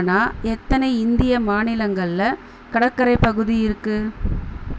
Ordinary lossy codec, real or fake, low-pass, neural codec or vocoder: none; real; none; none